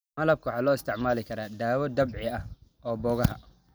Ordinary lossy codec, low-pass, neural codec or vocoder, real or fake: none; none; none; real